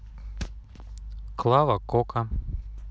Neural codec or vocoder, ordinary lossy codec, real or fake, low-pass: none; none; real; none